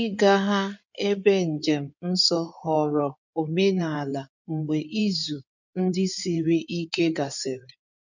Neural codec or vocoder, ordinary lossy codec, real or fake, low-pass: codec, 16 kHz in and 24 kHz out, 2.2 kbps, FireRedTTS-2 codec; none; fake; 7.2 kHz